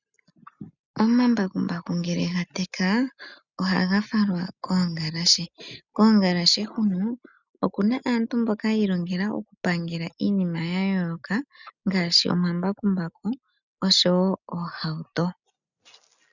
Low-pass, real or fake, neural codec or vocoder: 7.2 kHz; real; none